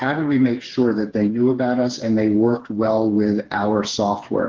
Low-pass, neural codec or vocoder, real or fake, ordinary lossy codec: 7.2 kHz; codec, 16 kHz, 4 kbps, FreqCodec, smaller model; fake; Opus, 16 kbps